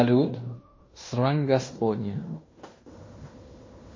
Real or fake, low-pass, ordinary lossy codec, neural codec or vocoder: fake; 7.2 kHz; MP3, 32 kbps; codec, 16 kHz in and 24 kHz out, 0.9 kbps, LongCat-Audio-Codec, fine tuned four codebook decoder